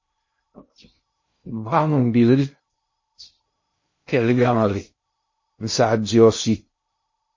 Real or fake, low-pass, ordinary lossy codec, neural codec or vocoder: fake; 7.2 kHz; MP3, 32 kbps; codec, 16 kHz in and 24 kHz out, 0.6 kbps, FocalCodec, streaming, 2048 codes